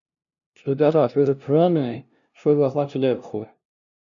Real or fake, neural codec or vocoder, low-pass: fake; codec, 16 kHz, 0.5 kbps, FunCodec, trained on LibriTTS, 25 frames a second; 7.2 kHz